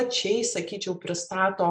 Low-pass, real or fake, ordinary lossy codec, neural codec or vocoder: 9.9 kHz; real; MP3, 64 kbps; none